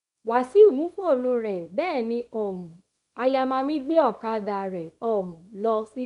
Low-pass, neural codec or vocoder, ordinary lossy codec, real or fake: 10.8 kHz; codec, 24 kHz, 0.9 kbps, WavTokenizer, small release; none; fake